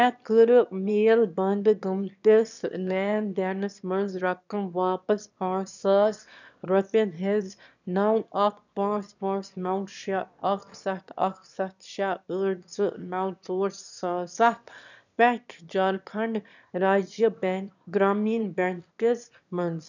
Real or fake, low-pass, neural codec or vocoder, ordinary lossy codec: fake; 7.2 kHz; autoencoder, 22.05 kHz, a latent of 192 numbers a frame, VITS, trained on one speaker; none